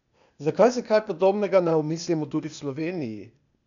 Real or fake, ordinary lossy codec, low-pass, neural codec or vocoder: fake; none; 7.2 kHz; codec, 16 kHz, 0.8 kbps, ZipCodec